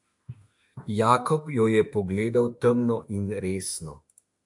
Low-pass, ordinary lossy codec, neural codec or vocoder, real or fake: 10.8 kHz; AAC, 64 kbps; autoencoder, 48 kHz, 32 numbers a frame, DAC-VAE, trained on Japanese speech; fake